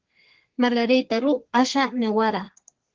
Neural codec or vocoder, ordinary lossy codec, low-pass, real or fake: codec, 32 kHz, 1.9 kbps, SNAC; Opus, 16 kbps; 7.2 kHz; fake